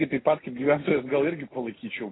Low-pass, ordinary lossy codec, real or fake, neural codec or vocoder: 7.2 kHz; AAC, 16 kbps; real; none